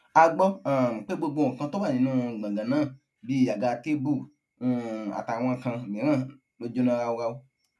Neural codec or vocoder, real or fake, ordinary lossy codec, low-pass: none; real; none; none